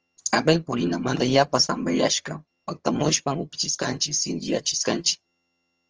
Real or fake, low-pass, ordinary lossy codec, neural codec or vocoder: fake; 7.2 kHz; Opus, 24 kbps; vocoder, 22.05 kHz, 80 mel bands, HiFi-GAN